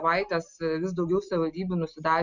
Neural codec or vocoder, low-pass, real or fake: none; 7.2 kHz; real